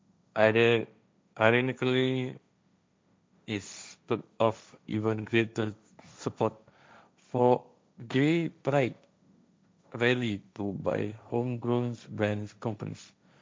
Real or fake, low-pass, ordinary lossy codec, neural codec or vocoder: fake; none; none; codec, 16 kHz, 1.1 kbps, Voila-Tokenizer